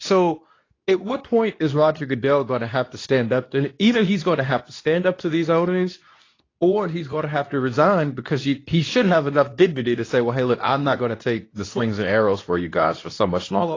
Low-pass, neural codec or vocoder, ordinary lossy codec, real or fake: 7.2 kHz; codec, 24 kHz, 0.9 kbps, WavTokenizer, medium speech release version 2; AAC, 32 kbps; fake